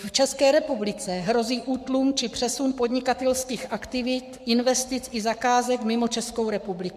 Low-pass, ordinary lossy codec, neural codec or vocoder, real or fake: 14.4 kHz; MP3, 96 kbps; codec, 44.1 kHz, 7.8 kbps, Pupu-Codec; fake